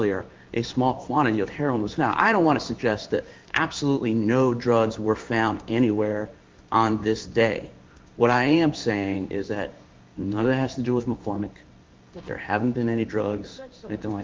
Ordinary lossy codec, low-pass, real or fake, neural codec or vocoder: Opus, 24 kbps; 7.2 kHz; fake; codec, 16 kHz in and 24 kHz out, 1 kbps, XY-Tokenizer